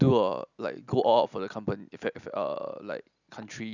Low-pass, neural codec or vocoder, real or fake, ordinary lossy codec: 7.2 kHz; none; real; none